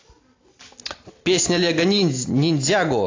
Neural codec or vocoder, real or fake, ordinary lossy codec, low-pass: none; real; AAC, 32 kbps; 7.2 kHz